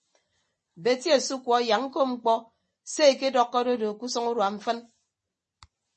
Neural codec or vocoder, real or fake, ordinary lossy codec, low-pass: none; real; MP3, 32 kbps; 10.8 kHz